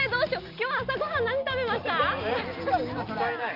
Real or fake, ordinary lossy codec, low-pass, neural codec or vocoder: real; Opus, 32 kbps; 5.4 kHz; none